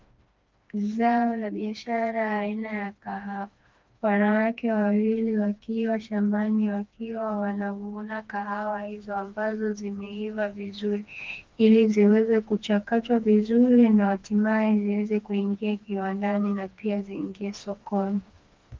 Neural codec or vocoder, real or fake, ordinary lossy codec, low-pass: codec, 16 kHz, 2 kbps, FreqCodec, smaller model; fake; Opus, 32 kbps; 7.2 kHz